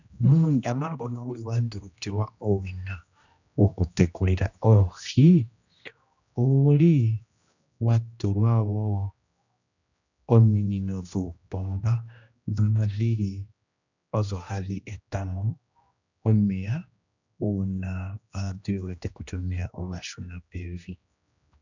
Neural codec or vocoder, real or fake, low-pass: codec, 16 kHz, 1 kbps, X-Codec, HuBERT features, trained on general audio; fake; 7.2 kHz